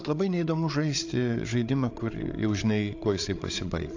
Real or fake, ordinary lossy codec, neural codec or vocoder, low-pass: fake; AAC, 48 kbps; codec, 16 kHz, 8 kbps, FreqCodec, larger model; 7.2 kHz